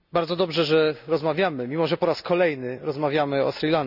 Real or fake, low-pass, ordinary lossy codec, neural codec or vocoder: real; 5.4 kHz; none; none